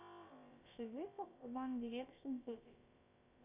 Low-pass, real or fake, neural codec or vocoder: 3.6 kHz; fake; codec, 16 kHz, about 1 kbps, DyCAST, with the encoder's durations